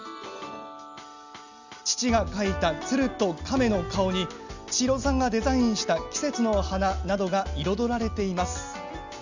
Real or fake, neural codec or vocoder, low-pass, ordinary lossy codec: real; none; 7.2 kHz; none